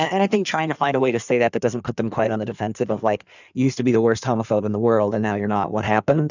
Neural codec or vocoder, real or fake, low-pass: codec, 16 kHz in and 24 kHz out, 1.1 kbps, FireRedTTS-2 codec; fake; 7.2 kHz